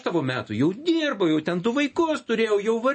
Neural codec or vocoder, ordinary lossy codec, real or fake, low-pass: none; MP3, 32 kbps; real; 10.8 kHz